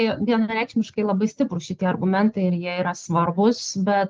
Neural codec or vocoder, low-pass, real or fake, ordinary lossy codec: none; 7.2 kHz; real; Opus, 16 kbps